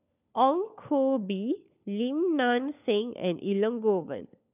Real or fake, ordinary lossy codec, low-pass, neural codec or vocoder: fake; none; 3.6 kHz; codec, 16 kHz, 4 kbps, FunCodec, trained on LibriTTS, 50 frames a second